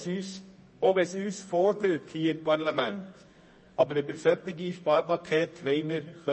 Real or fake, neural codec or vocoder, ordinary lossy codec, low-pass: fake; codec, 24 kHz, 0.9 kbps, WavTokenizer, medium music audio release; MP3, 32 kbps; 10.8 kHz